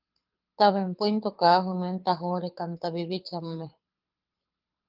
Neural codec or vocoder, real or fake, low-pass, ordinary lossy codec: codec, 24 kHz, 6 kbps, HILCodec; fake; 5.4 kHz; Opus, 32 kbps